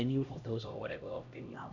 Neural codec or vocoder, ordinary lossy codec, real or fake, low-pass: codec, 16 kHz, 1 kbps, X-Codec, HuBERT features, trained on LibriSpeech; none; fake; 7.2 kHz